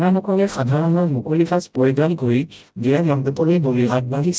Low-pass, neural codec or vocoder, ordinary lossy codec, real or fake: none; codec, 16 kHz, 0.5 kbps, FreqCodec, smaller model; none; fake